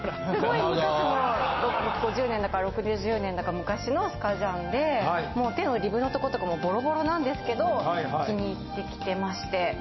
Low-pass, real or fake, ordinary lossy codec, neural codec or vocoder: 7.2 kHz; real; MP3, 24 kbps; none